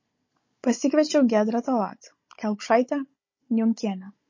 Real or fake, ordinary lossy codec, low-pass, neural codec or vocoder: fake; MP3, 32 kbps; 7.2 kHz; codec, 16 kHz, 16 kbps, FunCodec, trained on Chinese and English, 50 frames a second